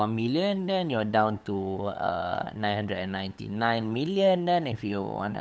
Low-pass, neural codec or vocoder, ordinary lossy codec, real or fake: none; codec, 16 kHz, 8 kbps, FunCodec, trained on LibriTTS, 25 frames a second; none; fake